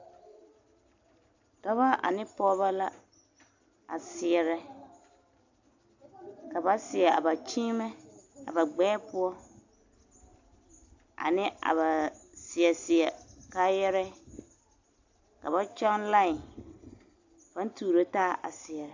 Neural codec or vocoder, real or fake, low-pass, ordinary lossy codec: none; real; 7.2 kHz; AAC, 48 kbps